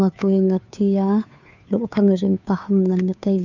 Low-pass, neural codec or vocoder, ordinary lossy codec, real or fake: 7.2 kHz; codec, 16 kHz, 2 kbps, FunCodec, trained on Chinese and English, 25 frames a second; none; fake